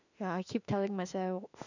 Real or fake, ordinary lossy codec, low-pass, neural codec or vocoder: fake; none; 7.2 kHz; autoencoder, 48 kHz, 32 numbers a frame, DAC-VAE, trained on Japanese speech